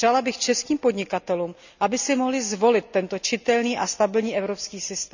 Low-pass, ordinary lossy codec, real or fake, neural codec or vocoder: 7.2 kHz; none; real; none